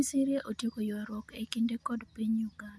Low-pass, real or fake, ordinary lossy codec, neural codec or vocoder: none; real; none; none